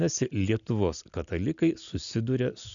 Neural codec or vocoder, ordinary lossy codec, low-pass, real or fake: none; MP3, 96 kbps; 7.2 kHz; real